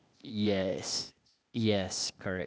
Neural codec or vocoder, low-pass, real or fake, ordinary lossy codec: codec, 16 kHz, 0.8 kbps, ZipCodec; none; fake; none